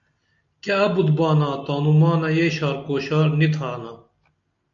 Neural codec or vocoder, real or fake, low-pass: none; real; 7.2 kHz